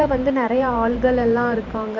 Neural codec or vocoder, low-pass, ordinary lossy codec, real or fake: vocoder, 44.1 kHz, 128 mel bands every 512 samples, BigVGAN v2; 7.2 kHz; none; fake